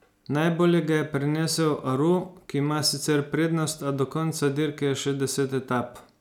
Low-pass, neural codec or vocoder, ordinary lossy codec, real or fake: 19.8 kHz; none; none; real